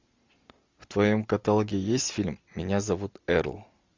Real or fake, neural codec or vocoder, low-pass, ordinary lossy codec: real; none; 7.2 kHz; MP3, 64 kbps